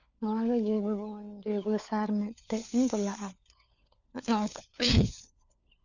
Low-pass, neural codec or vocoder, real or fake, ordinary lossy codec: 7.2 kHz; codec, 16 kHz, 4 kbps, FunCodec, trained on LibriTTS, 50 frames a second; fake; none